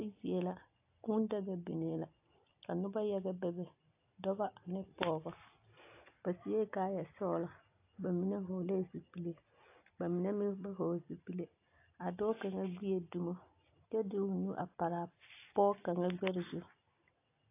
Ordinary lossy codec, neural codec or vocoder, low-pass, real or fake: AAC, 24 kbps; none; 3.6 kHz; real